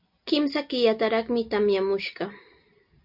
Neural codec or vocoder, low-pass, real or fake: none; 5.4 kHz; real